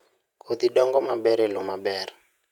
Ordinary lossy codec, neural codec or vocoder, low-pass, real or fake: none; vocoder, 48 kHz, 128 mel bands, Vocos; 19.8 kHz; fake